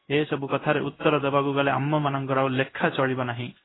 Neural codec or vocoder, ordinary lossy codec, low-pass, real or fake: codec, 16 kHz in and 24 kHz out, 1 kbps, XY-Tokenizer; AAC, 16 kbps; 7.2 kHz; fake